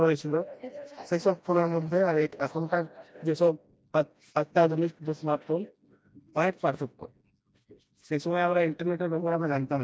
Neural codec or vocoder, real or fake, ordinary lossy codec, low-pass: codec, 16 kHz, 1 kbps, FreqCodec, smaller model; fake; none; none